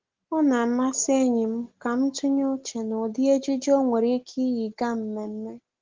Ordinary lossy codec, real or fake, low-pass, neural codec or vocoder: Opus, 16 kbps; real; 7.2 kHz; none